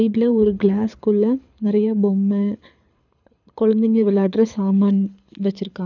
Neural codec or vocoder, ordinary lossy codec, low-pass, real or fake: codec, 24 kHz, 6 kbps, HILCodec; none; 7.2 kHz; fake